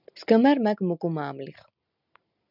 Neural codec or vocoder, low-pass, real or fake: none; 5.4 kHz; real